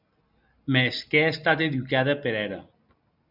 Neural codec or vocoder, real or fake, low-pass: none; real; 5.4 kHz